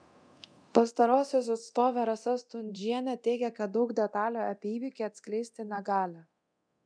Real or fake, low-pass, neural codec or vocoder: fake; 9.9 kHz; codec, 24 kHz, 0.9 kbps, DualCodec